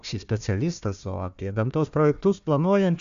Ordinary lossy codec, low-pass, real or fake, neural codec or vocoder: AAC, 96 kbps; 7.2 kHz; fake; codec, 16 kHz, 1 kbps, FunCodec, trained on Chinese and English, 50 frames a second